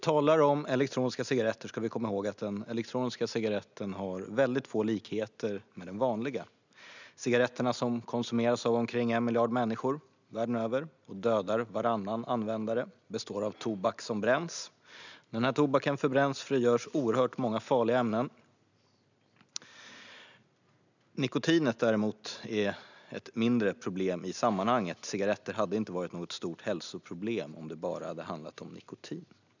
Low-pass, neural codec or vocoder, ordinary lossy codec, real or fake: 7.2 kHz; none; none; real